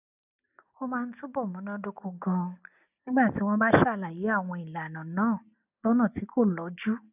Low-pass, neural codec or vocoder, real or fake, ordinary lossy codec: 3.6 kHz; none; real; none